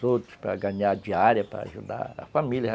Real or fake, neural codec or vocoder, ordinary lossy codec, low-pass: real; none; none; none